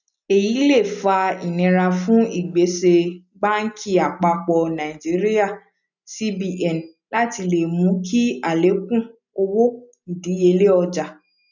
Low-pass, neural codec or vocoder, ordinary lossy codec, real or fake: 7.2 kHz; none; none; real